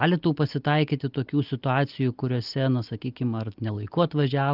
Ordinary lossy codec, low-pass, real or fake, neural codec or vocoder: Opus, 32 kbps; 5.4 kHz; real; none